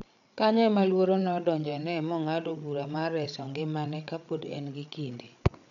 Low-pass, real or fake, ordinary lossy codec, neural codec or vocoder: 7.2 kHz; fake; none; codec, 16 kHz, 8 kbps, FreqCodec, larger model